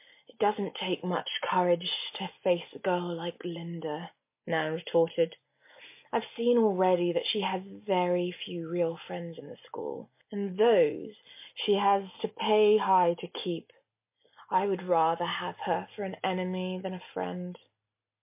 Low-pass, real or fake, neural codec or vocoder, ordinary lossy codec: 3.6 kHz; real; none; MP3, 24 kbps